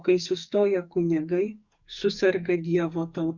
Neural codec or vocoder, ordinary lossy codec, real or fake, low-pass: codec, 44.1 kHz, 2.6 kbps, SNAC; Opus, 64 kbps; fake; 7.2 kHz